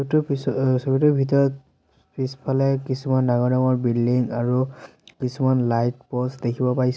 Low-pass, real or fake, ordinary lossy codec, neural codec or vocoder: none; real; none; none